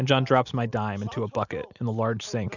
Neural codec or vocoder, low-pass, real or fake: none; 7.2 kHz; real